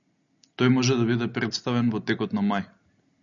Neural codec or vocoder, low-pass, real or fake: none; 7.2 kHz; real